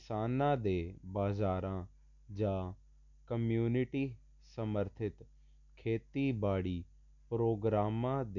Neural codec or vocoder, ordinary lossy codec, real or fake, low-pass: none; none; real; 7.2 kHz